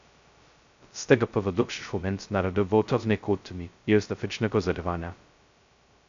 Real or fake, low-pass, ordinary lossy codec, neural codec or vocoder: fake; 7.2 kHz; MP3, 64 kbps; codec, 16 kHz, 0.2 kbps, FocalCodec